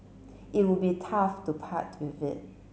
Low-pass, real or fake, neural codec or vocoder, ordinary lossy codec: none; real; none; none